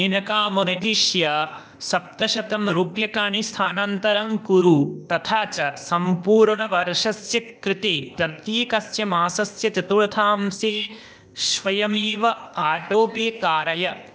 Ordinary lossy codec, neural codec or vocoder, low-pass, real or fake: none; codec, 16 kHz, 0.8 kbps, ZipCodec; none; fake